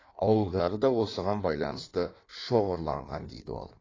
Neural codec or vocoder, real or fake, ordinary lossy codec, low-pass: codec, 16 kHz in and 24 kHz out, 1.1 kbps, FireRedTTS-2 codec; fake; AAC, 32 kbps; 7.2 kHz